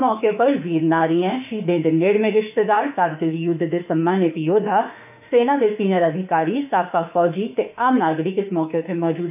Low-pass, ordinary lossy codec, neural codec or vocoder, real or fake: 3.6 kHz; none; autoencoder, 48 kHz, 32 numbers a frame, DAC-VAE, trained on Japanese speech; fake